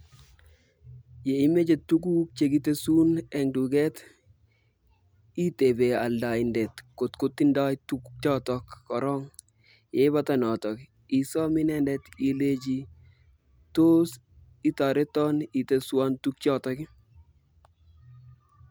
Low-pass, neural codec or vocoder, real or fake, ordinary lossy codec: none; none; real; none